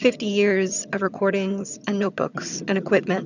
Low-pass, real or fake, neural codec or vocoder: 7.2 kHz; fake; vocoder, 22.05 kHz, 80 mel bands, HiFi-GAN